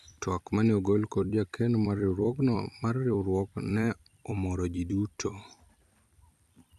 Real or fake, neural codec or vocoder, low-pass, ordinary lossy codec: fake; vocoder, 44.1 kHz, 128 mel bands every 256 samples, BigVGAN v2; 14.4 kHz; none